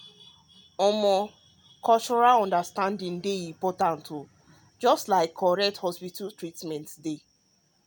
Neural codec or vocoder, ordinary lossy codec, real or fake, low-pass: none; none; real; none